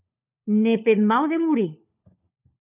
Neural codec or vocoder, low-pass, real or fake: codec, 16 kHz, 4 kbps, FunCodec, trained on LibriTTS, 50 frames a second; 3.6 kHz; fake